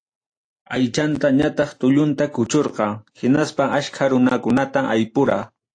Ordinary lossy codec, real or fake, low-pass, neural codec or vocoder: AAC, 48 kbps; real; 9.9 kHz; none